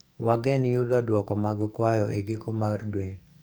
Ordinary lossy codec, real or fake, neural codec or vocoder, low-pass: none; fake; codec, 44.1 kHz, 2.6 kbps, SNAC; none